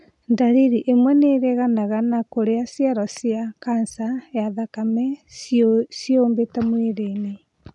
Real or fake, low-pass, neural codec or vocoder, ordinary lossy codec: real; 10.8 kHz; none; none